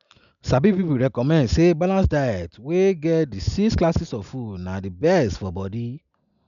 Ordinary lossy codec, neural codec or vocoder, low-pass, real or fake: none; none; 7.2 kHz; real